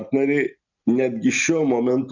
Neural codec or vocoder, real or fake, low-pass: none; real; 7.2 kHz